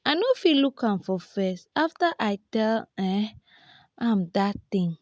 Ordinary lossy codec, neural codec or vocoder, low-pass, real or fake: none; none; none; real